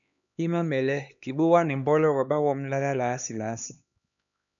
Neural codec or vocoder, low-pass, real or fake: codec, 16 kHz, 2 kbps, X-Codec, HuBERT features, trained on LibriSpeech; 7.2 kHz; fake